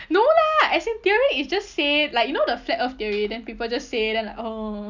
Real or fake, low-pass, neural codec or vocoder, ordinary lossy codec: real; 7.2 kHz; none; none